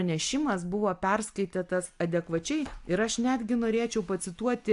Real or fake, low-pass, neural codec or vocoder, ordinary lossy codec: real; 10.8 kHz; none; AAC, 64 kbps